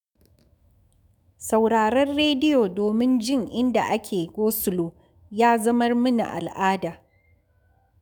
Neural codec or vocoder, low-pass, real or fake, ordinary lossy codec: autoencoder, 48 kHz, 128 numbers a frame, DAC-VAE, trained on Japanese speech; none; fake; none